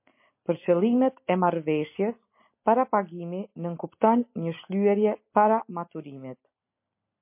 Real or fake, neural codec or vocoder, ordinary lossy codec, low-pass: real; none; MP3, 24 kbps; 3.6 kHz